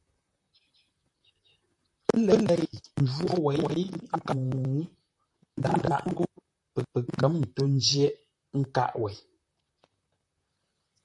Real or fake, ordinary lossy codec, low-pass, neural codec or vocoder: fake; MP3, 64 kbps; 10.8 kHz; vocoder, 44.1 kHz, 128 mel bands, Pupu-Vocoder